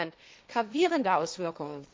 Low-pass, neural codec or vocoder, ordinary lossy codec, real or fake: none; codec, 16 kHz, 1.1 kbps, Voila-Tokenizer; none; fake